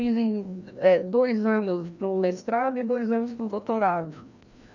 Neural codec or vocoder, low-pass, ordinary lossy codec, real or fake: codec, 16 kHz, 1 kbps, FreqCodec, larger model; 7.2 kHz; none; fake